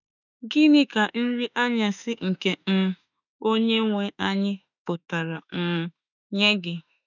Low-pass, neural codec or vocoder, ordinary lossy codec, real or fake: 7.2 kHz; autoencoder, 48 kHz, 32 numbers a frame, DAC-VAE, trained on Japanese speech; none; fake